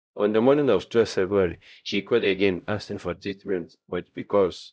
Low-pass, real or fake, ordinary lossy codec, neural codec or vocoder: none; fake; none; codec, 16 kHz, 0.5 kbps, X-Codec, HuBERT features, trained on LibriSpeech